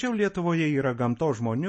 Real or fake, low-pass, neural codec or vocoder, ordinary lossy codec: real; 10.8 kHz; none; MP3, 32 kbps